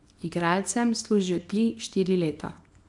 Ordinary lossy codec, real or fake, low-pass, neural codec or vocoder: none; fake; 10.8 kHz; codec, 24 kHz, 0.9 kbps, WavTokenizer, small release